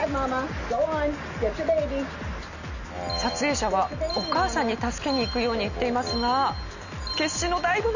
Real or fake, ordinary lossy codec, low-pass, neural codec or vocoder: real; none; 7.2 kHz; none